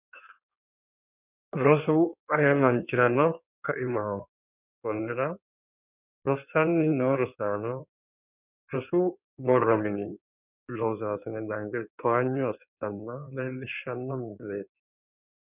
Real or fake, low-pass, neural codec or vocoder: fake; 3.6 kHz; codec, 16 kHz in and 24 kHz out, 1.1 kbps, FireRedTTS-2 codec